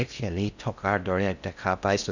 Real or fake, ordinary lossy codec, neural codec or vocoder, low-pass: fake; none; codec, 16 kHz in and 24 kHz out, 0.6 kbps, FocalCodec, streaming, 4096 codes; 7.2 kHz